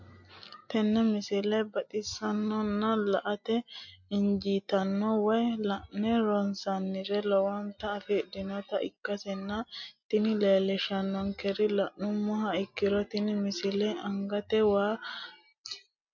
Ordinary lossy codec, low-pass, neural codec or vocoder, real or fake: MP3, 48 kbps; 7.2 kHz; none; real